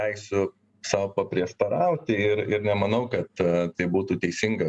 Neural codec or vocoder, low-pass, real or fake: none; 10.8 kHz; real